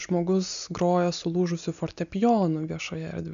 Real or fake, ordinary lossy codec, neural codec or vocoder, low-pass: real; AAC, 96 kbps; none; 7.2 kHz